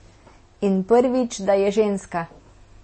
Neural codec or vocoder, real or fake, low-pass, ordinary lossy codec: none; real; 9.9 kHz; MP3, 32 kbps